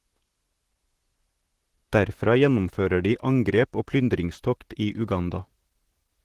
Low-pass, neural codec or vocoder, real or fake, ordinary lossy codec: 14.4 kHz; vocoder, 44.1 kHz, 128 mel bands, Pupu-Vocoder; fake; Opus, 16 kbps